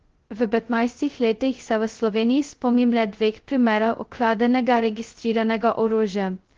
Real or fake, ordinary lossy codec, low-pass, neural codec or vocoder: fake; Opus, 16 kbps; 7.2 kHz; codec, 16 kHz, 0.2 kbps, FocalCodec